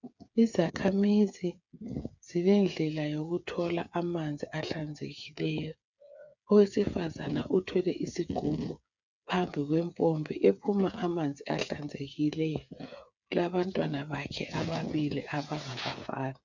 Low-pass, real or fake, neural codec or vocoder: 7.2 kHz; fake; codec, 16 kHz, 8 kbps, FreqCodec, smaller model